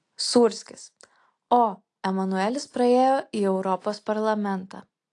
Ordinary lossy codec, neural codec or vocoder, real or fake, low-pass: AAC, 48 kbps; none; real; 10.8 kHz